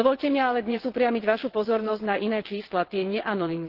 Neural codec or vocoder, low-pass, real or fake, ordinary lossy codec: vocoder, 22.05 kHz, 80 mel bands, WaveNeXt; 5.4 kHz; fake; Opus, 16 kbps